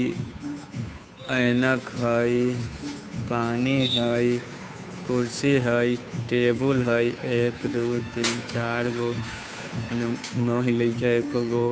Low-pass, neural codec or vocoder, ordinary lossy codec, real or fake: none; codec, 16 kHz, 2 kbps, FunCodec, trained on Chinese and English, 25 frames a second; none; fake